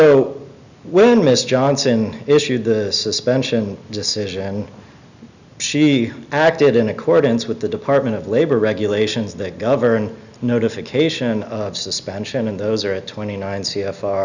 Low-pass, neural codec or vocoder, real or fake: 7.2 kHz; none; real